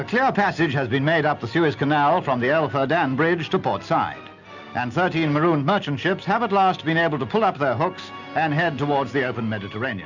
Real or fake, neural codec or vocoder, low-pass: real; none; 7.2 kHz